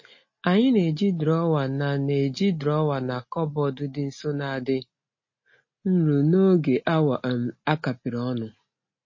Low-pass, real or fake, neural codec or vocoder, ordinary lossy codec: 7.2 kHz; real; none; MP3, 32 kbps